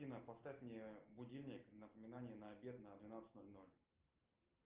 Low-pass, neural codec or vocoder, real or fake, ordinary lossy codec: 3.6 kHz; none; real; Opus, 32 kbps